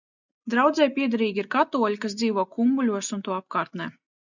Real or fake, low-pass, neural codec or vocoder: real; 7.2 kHz; none